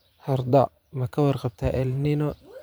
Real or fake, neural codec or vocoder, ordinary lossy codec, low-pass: fake; vocoder, 44.1 kHz, 128 mel bands every 512 samples, BigVGAN v2; none; none